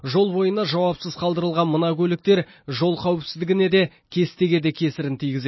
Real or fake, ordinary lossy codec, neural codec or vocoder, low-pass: real; MP3, 24 kbps; none; 7.2 kHz